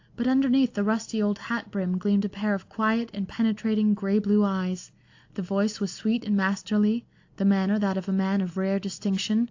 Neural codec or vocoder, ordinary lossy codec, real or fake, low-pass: none; AAC, 48 kbps; real; 7.2 kHz